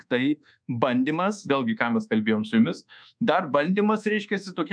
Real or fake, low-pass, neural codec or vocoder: fake; 9.9 kHz; codec, 24 kHz, 1.2 kbps, DualCodec